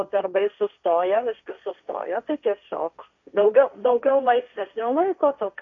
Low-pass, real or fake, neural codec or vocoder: 7.2 kHz; fake; codec, 16 kHz, 1.1 kbps, Voila-Tokenizer